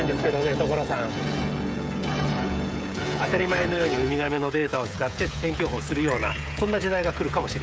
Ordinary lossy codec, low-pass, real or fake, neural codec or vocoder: none; none; fake; codec, 16 kHz, 16 kbps, FreqCodec, smaller model